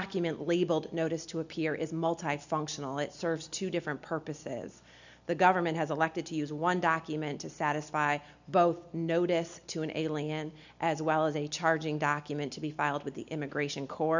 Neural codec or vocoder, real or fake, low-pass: none; real; 7.2 kHz